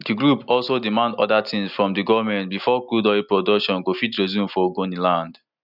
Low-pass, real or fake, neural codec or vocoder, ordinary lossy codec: 5.4 kHz; real; none; none